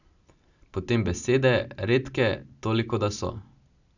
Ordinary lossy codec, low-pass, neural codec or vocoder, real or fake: none; 7.2 kHz; none; real